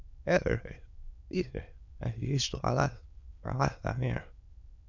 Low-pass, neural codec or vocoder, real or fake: 7.2 kHz; autoencoder, 22.05 kHz, a latent of 192 numbers a frame, VITS, trained on many speakers; fake